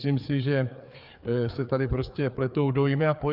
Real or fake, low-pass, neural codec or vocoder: fake; 5.4 kHz; codec, 16 kHz, 4 kbps, FreqCodec, larger model